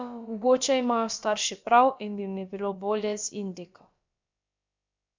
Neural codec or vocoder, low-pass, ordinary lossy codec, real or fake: codec, 16 kHz, about 1 kbps, DyCAST, with the encoder's durations; 7.2 kHz; MP3, 64 kbps; fake